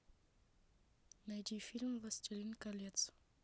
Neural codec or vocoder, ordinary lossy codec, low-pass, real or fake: none; none; none; real